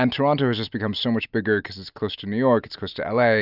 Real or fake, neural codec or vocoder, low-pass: real; none; 5.4 kHz